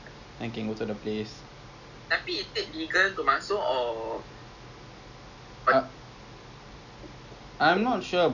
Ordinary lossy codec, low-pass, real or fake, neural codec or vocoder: none; 7.2 kHz; real; none